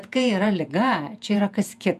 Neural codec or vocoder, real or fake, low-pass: vocoder, 48 kHz, 128 mel bands, Vocos; fake; 14.4 kHz